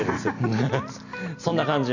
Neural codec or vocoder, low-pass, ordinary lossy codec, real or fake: none; 7.2 kHz; none; real